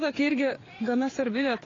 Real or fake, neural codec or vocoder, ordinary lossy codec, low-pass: fake; codec, 16 kHz, 4 kbps, FreqCodec, larger model; AAC, 32 kbps; 7.2 kHz